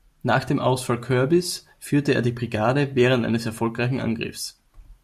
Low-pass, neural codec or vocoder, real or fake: 14.4 kHz; none; real